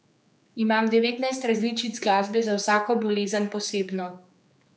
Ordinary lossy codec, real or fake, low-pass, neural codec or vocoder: none; fake; none; codec, 16 kHz, 4 kbps, X-Codec, HuBERT features, trained on general audio